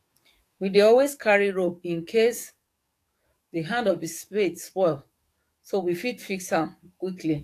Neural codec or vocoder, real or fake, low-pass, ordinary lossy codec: codec, 44.1 kHz, 7.8 kbps, DAC; fake; 14.4 kHz; AAC, 64 kbps